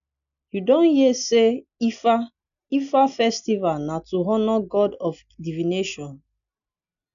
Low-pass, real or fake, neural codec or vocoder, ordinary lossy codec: 7.2 kHz; real; none; none